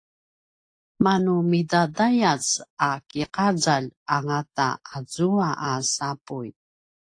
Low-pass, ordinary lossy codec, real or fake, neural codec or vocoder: 9.9 kHz; AAC, 48 kbps; real; none